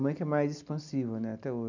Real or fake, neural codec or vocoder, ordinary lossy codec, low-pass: real; none; none; 7.2 kHz